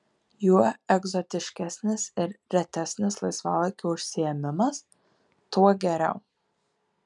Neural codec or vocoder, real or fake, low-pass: none; real; 10.8 kHz